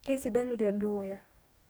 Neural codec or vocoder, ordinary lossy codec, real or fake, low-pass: codec, 44.1 kHz, 2.6 kbps, DAC; none; fake; none